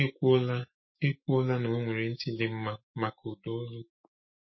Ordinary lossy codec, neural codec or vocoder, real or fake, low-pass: MP3, 24 kbps; autoencoder, 48 kHz, 128 numbers a frame, DAC-VAE, trained on Japanese speech; fake; 7.2 kHz